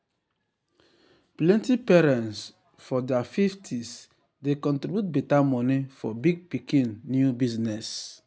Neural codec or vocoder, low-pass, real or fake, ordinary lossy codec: none; none; real; none